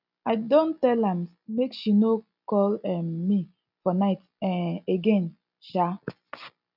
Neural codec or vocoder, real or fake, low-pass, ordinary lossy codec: none; real; 5.4 kHz; none